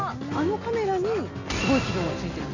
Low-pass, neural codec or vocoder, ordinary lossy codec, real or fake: 7.2 kHz; none; none; real